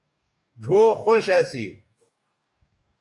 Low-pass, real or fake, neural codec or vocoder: 10.8 kHz; fake; codec, 44.1 kHz, 2.6 kbps, DAC